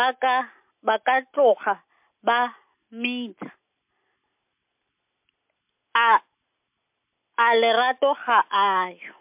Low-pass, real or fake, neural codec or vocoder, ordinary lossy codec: 3.6 kHz; real; none; MP3, 32 kbps